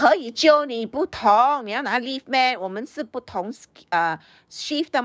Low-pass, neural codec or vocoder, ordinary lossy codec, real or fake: none; codec, 16 kHz, 6 kbps, DAC; none; fake